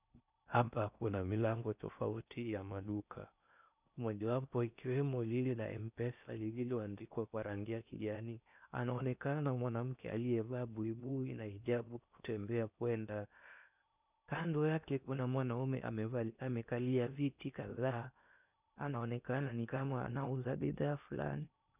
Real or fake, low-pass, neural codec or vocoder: fake; 3.6 kHz; codec, 16 kHz in and 24 kHz out, 0.6 kbps, FocalCodec, streaming, 4096 codes